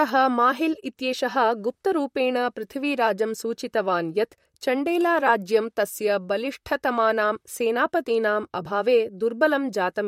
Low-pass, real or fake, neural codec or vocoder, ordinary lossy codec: 19.8 kHz; fake; vocoder, 44.1 kHz, 128 mel bands, Pupu-Vocoder; MP3, 64 kbps